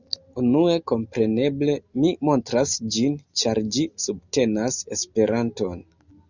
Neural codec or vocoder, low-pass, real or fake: none; 7.2 kHz; real